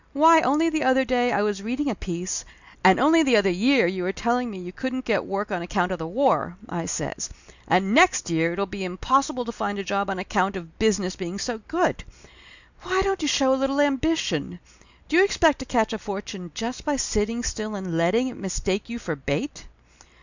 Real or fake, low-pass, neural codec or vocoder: real; 7.2 kHz; none